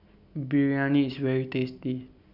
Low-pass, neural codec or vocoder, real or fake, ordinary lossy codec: 5.4 kHz; none; real; none